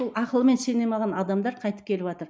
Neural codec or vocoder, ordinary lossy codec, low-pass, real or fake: none; none; none; real